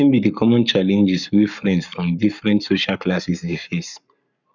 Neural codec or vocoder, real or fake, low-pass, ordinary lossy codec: codec, 44.1 kHz, 7.8 kbps, Pupu-Codec; fake; 7.2 kHz; none